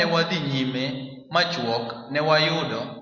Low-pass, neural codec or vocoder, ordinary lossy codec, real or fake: 7.2 kHz; none; Opus, 64 kbps; real